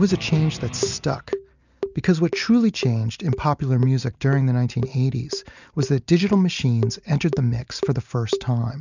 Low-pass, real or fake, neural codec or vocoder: 7.2 kHz; real; none